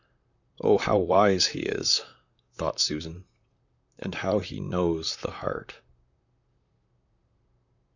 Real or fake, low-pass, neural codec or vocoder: fake; 7.2 kHz; vocoder, 44.1 kHz, 128 mel bands, Pupu-Vocoder